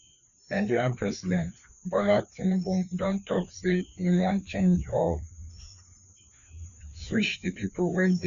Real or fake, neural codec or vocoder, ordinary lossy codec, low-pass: fake; codec, 16 kHz, 2 kbps, FreqCodec, larger model; none; 7.2 kHz